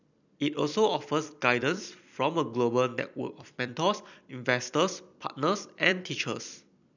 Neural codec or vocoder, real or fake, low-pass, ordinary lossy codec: vocoder, 44.1 kHz, 128 mel bands every 256 samples, BigVGAN v2; fake; 7.2 kHz; none